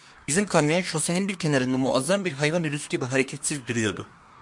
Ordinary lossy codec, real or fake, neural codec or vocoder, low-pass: MP3, 64 kbps; fake; codec, 24 kHz, 1 kbps, SNAC; 10.8 kHz